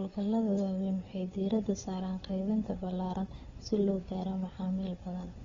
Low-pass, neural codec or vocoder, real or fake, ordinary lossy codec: 7.2 kHz; codec, 16 kHz, 4 kbps, FunCodec, trained on Chinese and English, 50 frames a second; fake; AAC, 24 kbps